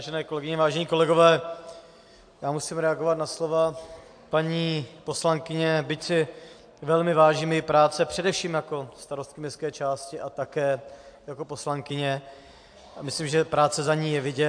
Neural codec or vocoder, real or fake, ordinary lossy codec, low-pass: none; real; AAC, 64 kbps; 9.9 kHz